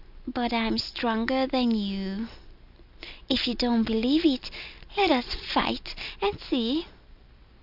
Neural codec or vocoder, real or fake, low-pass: none; real; 5.4 kHz